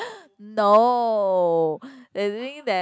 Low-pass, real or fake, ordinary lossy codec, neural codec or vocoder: none; real; none; none